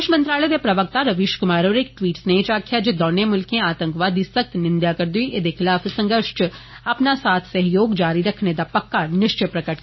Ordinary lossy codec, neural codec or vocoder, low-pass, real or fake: MP3, 24 kbps; none; 7.2 kHz; real